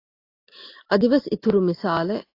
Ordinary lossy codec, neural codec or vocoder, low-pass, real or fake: AAC, 32 kbps; none; 5.4 kHz; real